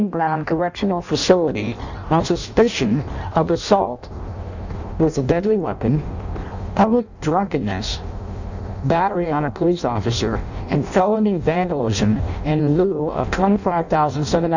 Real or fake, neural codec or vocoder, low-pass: fake; codec, 16 kHz in and 24 kHz out, 0.6 kbps, FireRedTTS-2 codec; 7.2 kHz